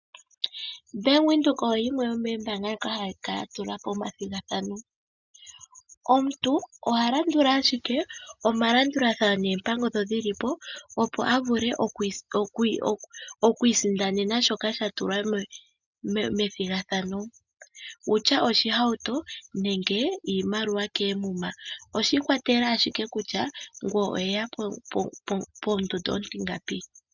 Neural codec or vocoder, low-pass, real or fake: none; 7.2 kHz; real